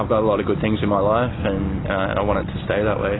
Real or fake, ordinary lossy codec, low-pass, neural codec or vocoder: real; AAC, 16 kbps; 7.2 kHz; none